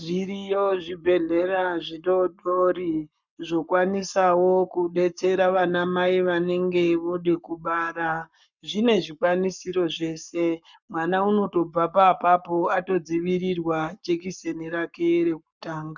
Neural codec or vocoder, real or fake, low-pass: codec, 44.1 kHz, 7.8 kbps, Pupu-Codec; fake; 7.2 kHz